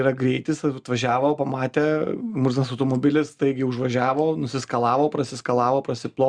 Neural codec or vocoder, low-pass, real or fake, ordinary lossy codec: none; 9.9 kHz; real; Opus, 64 kbps